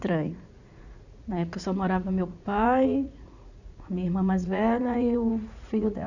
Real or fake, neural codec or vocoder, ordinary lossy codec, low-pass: fake; vocoder, 44.1 kHz, 80 mel bands, Vocos; none; 7.2 kHz